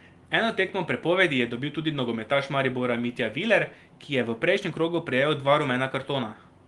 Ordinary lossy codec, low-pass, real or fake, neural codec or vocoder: Opus, 24 kbps; 10.8 kHz; real; none